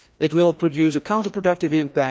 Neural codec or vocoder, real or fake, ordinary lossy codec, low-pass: codec, 16 kHz, 1 kbps, FreqCodec, larger model; fake; none; none